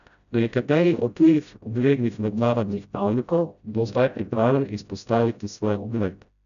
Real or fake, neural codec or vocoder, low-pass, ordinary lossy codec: fake; codec, 16 kHz, 0.5 kbps, FreqCodec, smaller model; 7.2 kHz; none